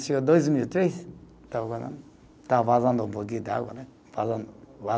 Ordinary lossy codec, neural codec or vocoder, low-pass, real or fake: none; none; none; real